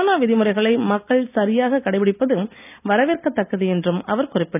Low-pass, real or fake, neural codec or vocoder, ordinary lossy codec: 3.6 kHz; real; none; none